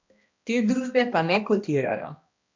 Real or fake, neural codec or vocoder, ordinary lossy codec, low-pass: fake; codec, 16 kHz, 1 kbps, X-Codec, HuBERT features, trained on balanced general audio; MP3, 64 kbps; 7.2 kHz